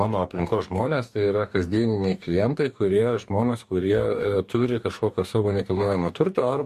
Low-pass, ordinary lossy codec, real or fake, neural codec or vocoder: 14.4 kHz; MP3, 64 kbps; fake; codec, 44.1 kHz, 2.6 kbps, DAC